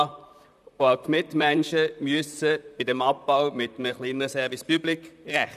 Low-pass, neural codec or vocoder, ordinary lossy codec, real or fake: 14.4 kHz; vocoder, 44.1 kHz, 128 mel bands, Pupu-Vocoder; none; fake